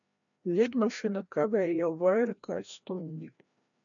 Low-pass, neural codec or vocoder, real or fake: 7.2 kHz; codec, 16 kHz, 1 kbps, FreqCodec, larger model; fake